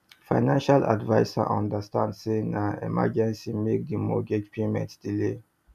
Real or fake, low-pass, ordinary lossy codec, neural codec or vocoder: real; 14.4 kHz; none; none